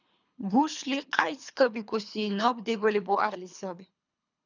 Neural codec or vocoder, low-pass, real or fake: codec, 24 kHz, 3 kbps, HILCodec; 7.2 kHz; fake